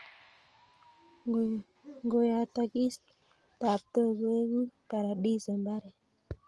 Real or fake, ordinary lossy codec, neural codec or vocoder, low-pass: real; Opus, 24 kbps; none; 10.8 kHz